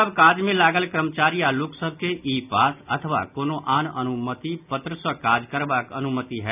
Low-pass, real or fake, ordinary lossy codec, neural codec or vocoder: 3.6 kHz; real; none; none